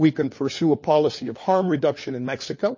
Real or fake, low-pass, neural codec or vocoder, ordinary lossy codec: fake; 7.2 kHz; codec, 16 kHz, 4 kbps, FunCodec, trained on LibriTTS, 50 frames a second; MP3, 32 kbps